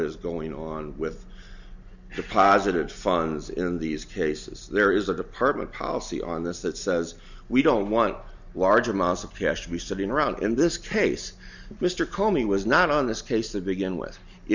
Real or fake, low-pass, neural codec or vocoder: real; 7.2 kHz; none